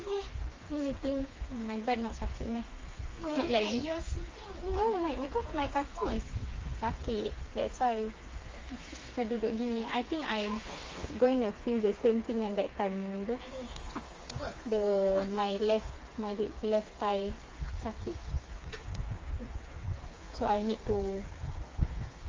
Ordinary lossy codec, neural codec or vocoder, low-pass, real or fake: Opus, 32 kbps; codec, 16 kHz, 4 kbps, FreqCodec, smaller model; 7.2 kHz; fake